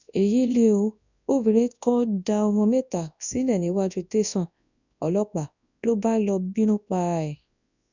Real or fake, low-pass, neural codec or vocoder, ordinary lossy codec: fake; 7.2 kHz; codec, 24 kHz, 0.9 kbps, WavTokenizer, large speech release; none